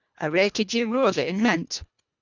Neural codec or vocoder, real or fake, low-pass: codec, 24 kHz, 1.5 kbps, HILCodec; fake; 7.2 kHz